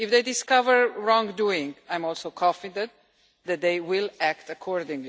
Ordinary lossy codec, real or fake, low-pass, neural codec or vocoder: none; real; none; none